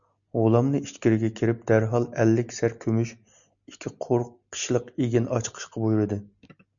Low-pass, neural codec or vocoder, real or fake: 7.2 kHz; none; real